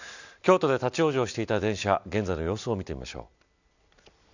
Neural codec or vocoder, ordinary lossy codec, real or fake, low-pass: none; none; real; 7.2 kHz